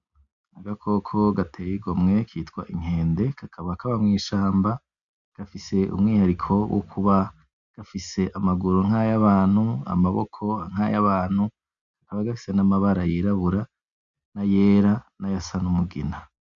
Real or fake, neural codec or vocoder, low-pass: real; none; 7.2 kHz